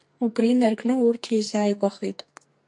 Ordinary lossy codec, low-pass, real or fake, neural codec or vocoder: MP3, 64 kbps; 10.8 kHz; fake; codec, 44.1 kHz, 2.6 kbps, SNAC